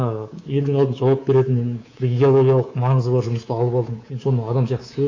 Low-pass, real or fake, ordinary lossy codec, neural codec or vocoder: 7.2 kHz; fake; AAC, 48 kbps; codec, 24 kHz, 3.1 kbps, DualCodec